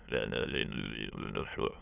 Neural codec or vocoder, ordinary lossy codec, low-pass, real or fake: autoencoder, 22.05 kHz, a latent of 192 numbers a frame, VITS, trained on many speakers; none; 3.6 kHz; fake